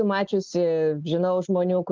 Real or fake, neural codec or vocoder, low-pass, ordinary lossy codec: real; none; 7.2 kHz; Opus, 16 kbps